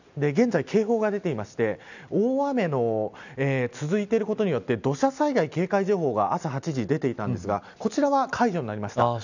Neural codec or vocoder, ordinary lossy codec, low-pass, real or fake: none; none; 7.2 kHz; real